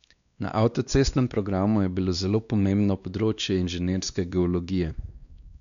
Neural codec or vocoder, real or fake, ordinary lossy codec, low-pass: codec, 16 kHz, 2 kbps, X-Codec, WavLM features, trained on Multilingual LibriSpeech; fake; none; 7.2 kHz